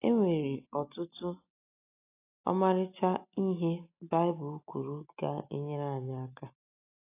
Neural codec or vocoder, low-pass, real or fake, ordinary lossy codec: none; 3.6 kHz; real; AAC, 24 kbps